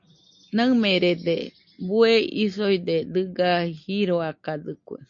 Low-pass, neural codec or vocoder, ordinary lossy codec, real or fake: 7.2 kHz; none; MP3, 48 kbps; real